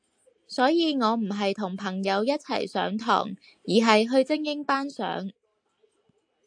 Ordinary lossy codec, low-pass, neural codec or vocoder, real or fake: AAC, 64 kbps; 9.9 kHz; none; real